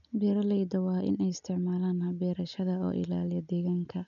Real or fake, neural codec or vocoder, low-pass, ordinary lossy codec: real; none; 7.2 kHz; none